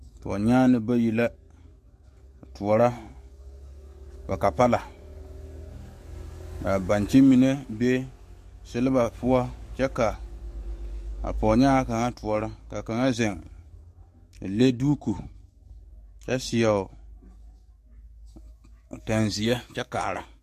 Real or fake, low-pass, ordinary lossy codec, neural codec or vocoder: fake; 14.4 kHz; MP3, 64 kbps; codec, 44.1 kHz, 7.8 kbps, DAC